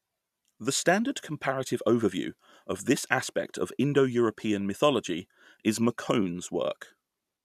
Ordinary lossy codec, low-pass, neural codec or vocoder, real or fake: none; 14.4 kHz; none; real